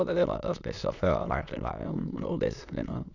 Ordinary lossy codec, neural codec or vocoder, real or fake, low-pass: none; autoencoder, 22.05 kHz, a latent of 192 numbers a frame, VITS, trained on many speakers; fake; 7.2 kHz